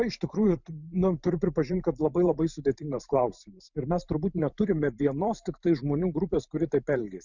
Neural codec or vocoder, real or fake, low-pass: vocoder, 44.1 kHz, 128 mel bands every 512 samples, BigVGAN v2; fake; 7.2 kHz